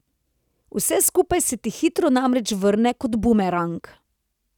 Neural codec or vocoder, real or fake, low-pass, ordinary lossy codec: none; real; 19.8 kHz; none